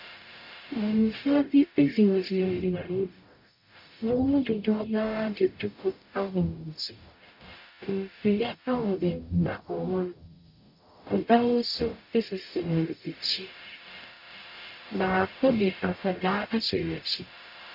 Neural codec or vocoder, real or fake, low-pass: codec, 44.1 kHz, 0.9 kbps, DAC; fake; 5.4 kHz